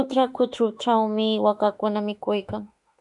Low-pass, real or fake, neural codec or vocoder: 10.8 kHz; fake; autoencoder, 48 kHz, 32 numbers a frame, DAC-VAE, trained on Japanese speech